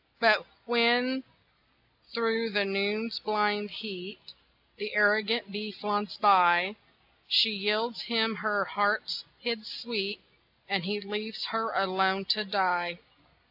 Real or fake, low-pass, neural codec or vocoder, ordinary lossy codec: real; 5.4 kHz; none; AAC, 48 kbps